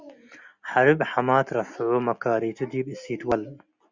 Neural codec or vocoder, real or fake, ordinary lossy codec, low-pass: none; real; Opus, 64 kbps; 7.2 kHz